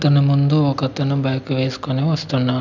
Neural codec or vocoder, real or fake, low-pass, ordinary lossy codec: none; real; 7.2 kHz; none